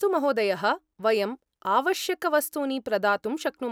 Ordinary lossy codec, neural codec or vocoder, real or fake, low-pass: none; none; real; none